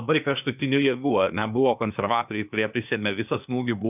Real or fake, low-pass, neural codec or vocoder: fake; 3.6 kHz; codec, 16 kHz, 0.8 kbps, ZipCodec